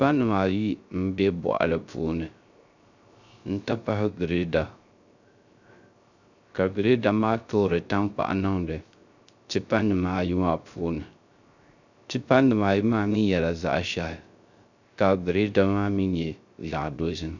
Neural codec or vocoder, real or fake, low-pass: codec, 16 kHz, 0.3 kbps, FocalCodec; fake; 7.2 kHz